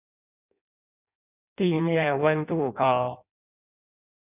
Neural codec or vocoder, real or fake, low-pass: codec, 16 kHz in and 24 kHz out, 0.6 kbps, FireRedTTS-2 codec; fake; 3.6 kHz